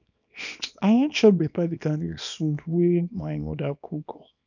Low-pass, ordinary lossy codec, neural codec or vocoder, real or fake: 7.2 kHz; none; codec, 24 kHz, 0.9 kbps, WavTokenizer, small release; fake